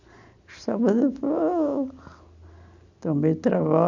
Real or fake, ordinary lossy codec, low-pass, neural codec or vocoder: real; none; 7.2 kHz; none